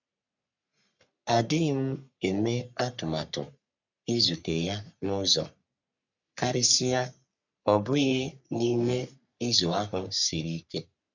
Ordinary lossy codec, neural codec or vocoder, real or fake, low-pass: none; codec, 44.1 kHz, 3.4 kbps, Pupu-Codec; fake; 7.2 kHz